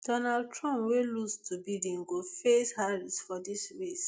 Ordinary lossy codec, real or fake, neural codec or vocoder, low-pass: none; real; none; none